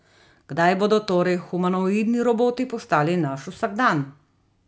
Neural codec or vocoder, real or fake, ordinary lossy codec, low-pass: none; real; none; none